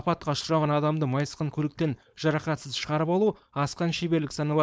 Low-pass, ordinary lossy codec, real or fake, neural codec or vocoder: none; none; fake; codec, 16 kHz, 4.8 kbps, FACodec